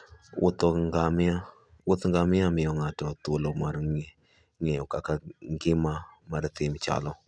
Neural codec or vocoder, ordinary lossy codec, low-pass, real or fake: none; none; 9.9 kHz; real